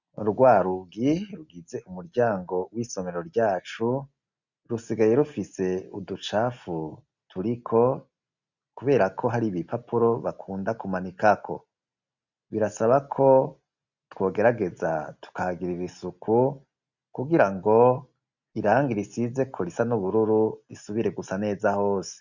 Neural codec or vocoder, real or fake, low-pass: none; real; 7.2 kHz